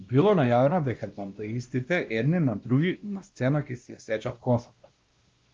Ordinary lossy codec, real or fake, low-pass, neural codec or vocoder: Opus, 32 kbps; fake; 7.2 kHz; codec, 16 kHz, 1 kbps, X-Codec, WavLM features, trained on Multilingual LibriSpeech